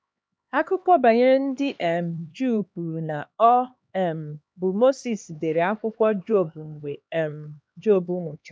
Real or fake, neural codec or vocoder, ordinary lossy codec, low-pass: fake; codec, 16 kHz, 2 kbps, X-Codec, HuBERT features, trained on LibriSpeech; none; none